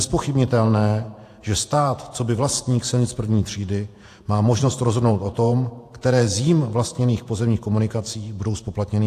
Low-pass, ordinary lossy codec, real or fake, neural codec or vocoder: 14.4 kHz; AAC, 64 kbps; fake; vocoder, 48 kHz, 128 mel bands, Vocos